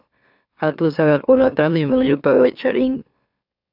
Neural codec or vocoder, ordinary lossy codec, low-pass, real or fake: autoencoder, 44.1 kHz, a latent of 192 numbers a frame, MeloTTS; AAC, 48 kbps; 5.4 kHz; fake